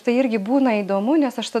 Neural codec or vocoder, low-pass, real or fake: none; 14.4 kHz; real